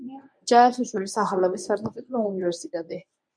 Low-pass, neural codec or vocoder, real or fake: 9.9 kHz; codec, 24 kHz, 0.9 kbps, WavTokenizer, medium speech release version 1; fake